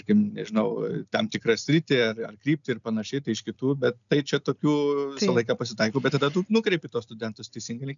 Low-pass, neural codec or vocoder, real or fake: 7.2 kHz; none; real